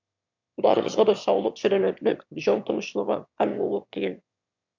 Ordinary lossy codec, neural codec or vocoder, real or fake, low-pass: MP3, 64 kbps; autoencoder, 22.05 kHz, a latent of 192 numbers a frame, VITS, trained on one speaker; fake; 7.2 kHz